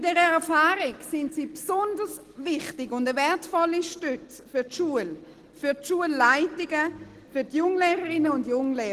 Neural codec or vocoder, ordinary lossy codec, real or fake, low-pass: none; Opus, 16 kbps; real; 14.4 kHz